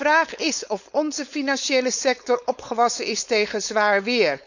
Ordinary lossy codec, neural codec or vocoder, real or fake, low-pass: none; codec, 16 kHz, 4.8 kbps, FACodec; fake; 7.2 kHz